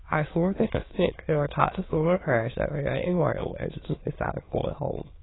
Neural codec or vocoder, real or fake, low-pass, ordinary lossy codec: autoencoder, 22.05 kHz, a latent of 192 numbers a frame, VITS, trained on many speakers; fake; 7.2 kHz; AAC, 16 kbps